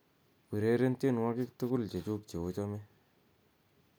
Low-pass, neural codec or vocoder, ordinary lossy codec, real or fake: none; none; none; real